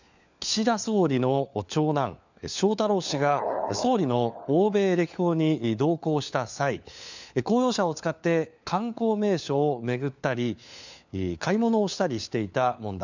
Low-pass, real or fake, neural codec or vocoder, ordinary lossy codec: 7.2 kHz; fake; codec, 16 kHz, 4 kbps, FunCodec, trained on LibriTTS, 50 frames a second; none